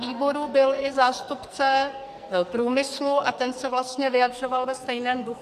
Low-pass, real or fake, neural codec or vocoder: 14.4 kHz; fake; codec, 44.1 kHz, 2.6 kbps, SNAC